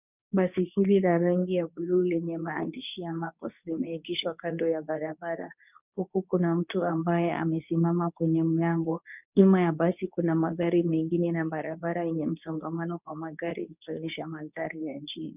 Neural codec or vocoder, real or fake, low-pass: codec, 24 kHz, 0.9 kbps, WavTokenizer, medium speech release version 2; fake; 3.6 kHz